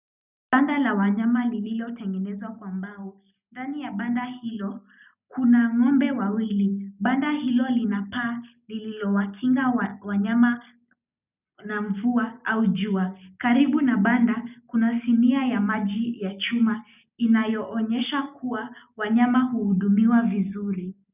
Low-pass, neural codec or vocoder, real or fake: 3.6 kHz; none; real